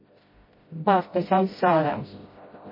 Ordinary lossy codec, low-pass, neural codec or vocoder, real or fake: MP3, 24 kbps; 5.4 kHz; codec, 16 kHz, 0.5 kbps, FreqCodec, smaller model; fake